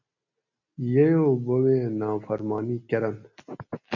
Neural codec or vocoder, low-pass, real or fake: none; 7.2 kHz; real